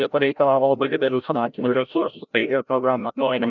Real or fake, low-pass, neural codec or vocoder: fake; 7.2 kHz; codec, 16 kHz, 0.5 kbps, FreqCodec, larger model